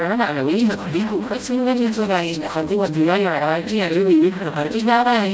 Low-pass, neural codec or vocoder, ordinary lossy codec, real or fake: none; codec, 16 kHz, 0.5 kbps, FreqCodec, smaller model; none; fake